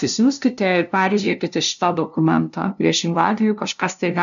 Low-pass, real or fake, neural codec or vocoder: 7.2 kHz; fake; codec, 16 kHz, 0.5 kbps, FunCodec, trained on LibriTTS, 25 frames a second